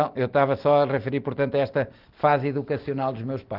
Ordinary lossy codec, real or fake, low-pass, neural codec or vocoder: Opus, 16 kbps; real; 5.4 kHz; none